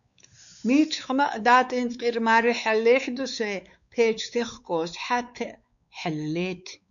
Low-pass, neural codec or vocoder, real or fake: 7.2 kHz; codec, 16 kHz, 4 kbps, X-Codec, WavLM features, trained on Multilingual LibriSpeech; fake